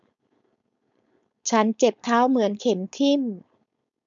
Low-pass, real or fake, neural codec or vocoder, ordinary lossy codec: 7.2 kHz; fake; codec, 16 kHz, 4.8 kbps, FACodec; none